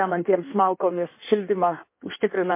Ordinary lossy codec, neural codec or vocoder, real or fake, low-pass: MP3, 24 kbps; codec, 16 kHz in and 24 kHz out, 1.1 kbps, FireRedTTS-2 codec; fake; 3.6 kHz